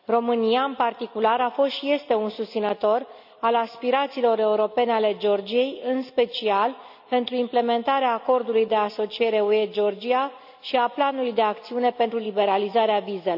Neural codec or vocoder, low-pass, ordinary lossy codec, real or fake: none; 5.4 kHz; none; real